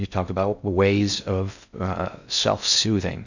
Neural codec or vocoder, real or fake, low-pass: codec, 16 kHz in and 24 kHz out, 0.6 kbps, FocalCodec, streaming, 2048 codes; fake; 7.2 kHz